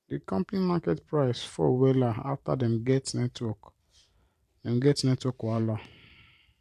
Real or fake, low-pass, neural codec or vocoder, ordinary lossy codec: fake; 14.4 kHz; vocoder, 44.1 kHz, 128 mel bands every 512 samples, BigVGAN v2; none